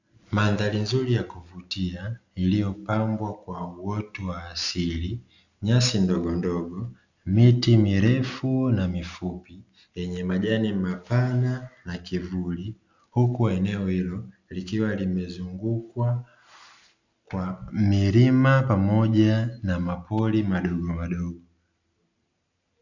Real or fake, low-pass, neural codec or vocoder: real; 7.2 kHz; none